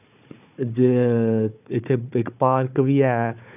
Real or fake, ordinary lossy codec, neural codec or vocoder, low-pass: fake; none; codec, 16 kHz, 4 kbps, FunCodec, trained on Chinese and English, 50 frames a second; 3.6 kHz